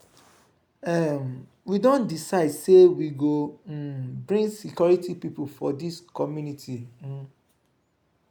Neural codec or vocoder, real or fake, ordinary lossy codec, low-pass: none; real; none; none